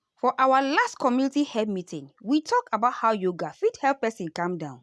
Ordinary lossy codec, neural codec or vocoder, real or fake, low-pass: none; none; real; none